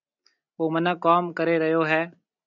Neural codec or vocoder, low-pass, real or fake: none; 7.2 kHz; real